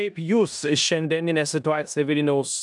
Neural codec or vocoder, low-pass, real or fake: codec, 16 kHz in and 24 kHz out, 0.9 kbps, LongCat-Audio-Codec, four codebook decoder; 10.8 kHz; fake